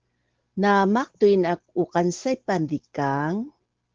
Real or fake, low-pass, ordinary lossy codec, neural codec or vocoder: real; 7.2 kHz; Opus, 16 kbps; none